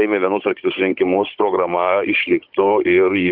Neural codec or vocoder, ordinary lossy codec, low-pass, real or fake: codec, 16 kHz, 16 kbps, FunCodec, trained on Chinese and English, 50 frames a second; Opus, 24 kbps; 5.4 kHz; fake